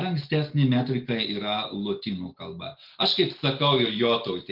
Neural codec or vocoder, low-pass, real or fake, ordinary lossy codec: none; 5.4 kHz; real; Opus, 24 kbps